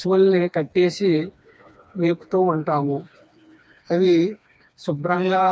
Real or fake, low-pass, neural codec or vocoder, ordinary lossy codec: fake; none; codec, 16 kHz, 2 kbps, FreqCodec, smaller model; none